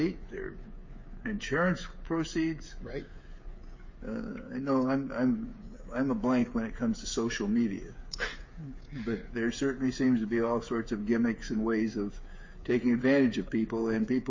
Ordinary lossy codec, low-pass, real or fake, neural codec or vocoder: MP3, 32 kbps; 7.2 kHz; fake; codec, 16 kHz, 8 kbps, FreqCodec, smaller model